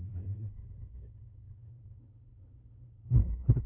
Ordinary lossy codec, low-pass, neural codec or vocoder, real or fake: none; 3.6 kHz; codec, 16 kHz, 0.5 kbps, FunCodec, trained on LibriTTS, 25 frames a second; fake